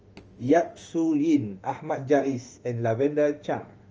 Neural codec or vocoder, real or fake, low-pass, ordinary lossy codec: autoencoder, 48 kHz, 32 numbers a frame, DAC-VAE, trained on Japanese speech; fake; 7.2 kHz; Opus, 24 kbps